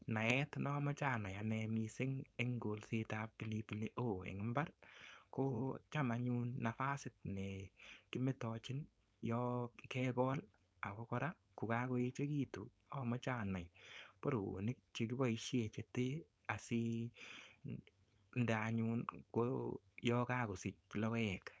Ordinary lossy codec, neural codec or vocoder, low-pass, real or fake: none; codec, 16 kHz, 4.8 kbps, FACodec; none; fake